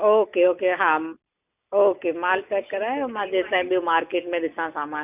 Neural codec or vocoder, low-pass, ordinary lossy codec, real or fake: none; 3.6 kHz; none; real